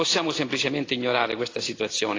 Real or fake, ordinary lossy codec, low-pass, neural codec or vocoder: real; AAC, 32 kbps; 7.2 kHz; none